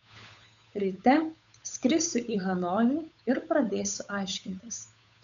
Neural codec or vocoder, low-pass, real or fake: codec, 16 kHz, 8 kbps, FunCodec, trained on Chinese and English, 25 frames a second; 7.2 kHz; fake